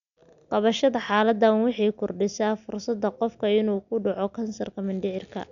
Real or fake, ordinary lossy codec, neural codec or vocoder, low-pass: real; none; none; 7.2 kHz